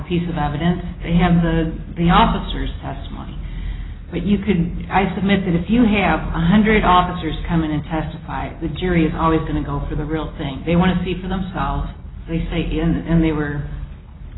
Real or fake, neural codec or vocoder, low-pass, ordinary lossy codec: real; none; 7.2 kHz; AAC, 16 kbps